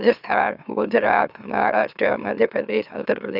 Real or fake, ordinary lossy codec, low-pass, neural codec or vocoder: fake; none; 5.4 kHz; autoencoder, 44.1 kHz, a latent of 192 numbers a frame, MeloTTS